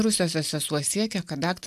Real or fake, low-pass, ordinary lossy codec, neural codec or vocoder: real; 14.4 kHz; AAC, 96 kbps; none